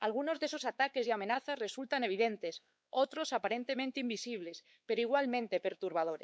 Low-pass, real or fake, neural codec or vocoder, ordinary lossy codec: none; fake; codec, 16 kHz, 4 kbps, X-Codec, WavLM features, trained on Multilingual LibriSpeech; none